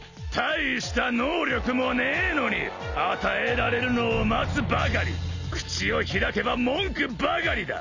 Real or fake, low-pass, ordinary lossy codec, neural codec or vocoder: real; 7.2 kHz; none; none